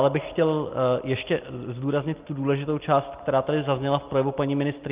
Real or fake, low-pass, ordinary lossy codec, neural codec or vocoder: real; 3.6 kHz; Opus, 32 kbps; none